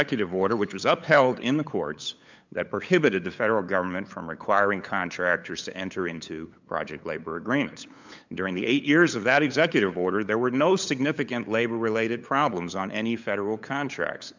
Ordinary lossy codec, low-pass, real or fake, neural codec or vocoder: MP3, 64 kbps; 7.2 kHz; fake; codec, 16 kHz, 8 kbps, FunCodec, trained on LibriTTS, 25 frames a second